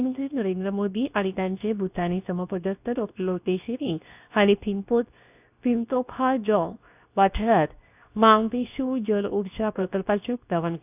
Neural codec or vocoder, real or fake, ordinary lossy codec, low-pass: codec, 24 kHz, 0.9 kbps, WavTokenizer, medium speech release version 2; fake; none; 3.6 kHz